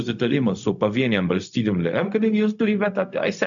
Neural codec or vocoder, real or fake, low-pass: codec, 16 kHz, 0.4 kbps, LongCat-Audio-Codec; fake; 7.2 kHz